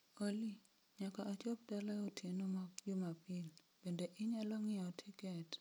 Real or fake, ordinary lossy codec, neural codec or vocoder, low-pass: real; none; none; none